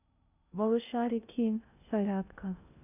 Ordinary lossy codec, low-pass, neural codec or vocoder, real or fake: none; 3.6 kHz; codec, 16 kHz in and 24 kHz out, 0.6 kbps, FocalCodec, streaming, 2048 codes; fake